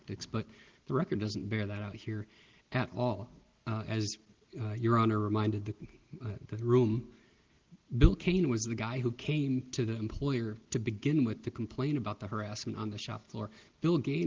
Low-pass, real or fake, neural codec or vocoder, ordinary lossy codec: 7.2 kHz; real; none; Opus, 16 kbps